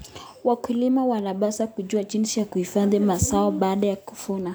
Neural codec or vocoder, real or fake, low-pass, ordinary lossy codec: none; real; none; none